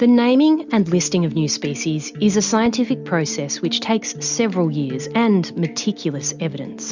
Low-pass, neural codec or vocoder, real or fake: 7.2 kHz; none; real